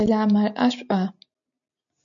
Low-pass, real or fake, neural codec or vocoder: 7.2 kHz; real; none